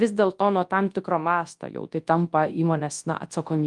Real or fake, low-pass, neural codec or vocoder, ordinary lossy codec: fake; 10.8 kHz; codec, 24 kHz, 0.9 kbps, WavTokenizer, large speech release; Opus, 32 kbps